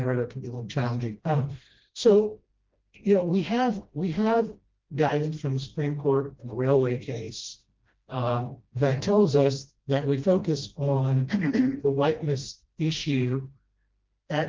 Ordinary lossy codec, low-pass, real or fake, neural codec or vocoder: Opus, 32 kbps; 7.2 kHz; fake; codec, 16 kHz, 1 kbps, FreqCodec, smaller model